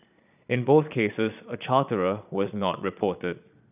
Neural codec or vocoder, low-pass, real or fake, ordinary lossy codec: vocoder, 22.05 kHz, 80 mel bands, Vocos; 3.6 kHz; fake; none